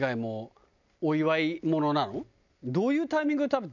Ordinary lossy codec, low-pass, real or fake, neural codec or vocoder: none; 7.2 kHz; real; none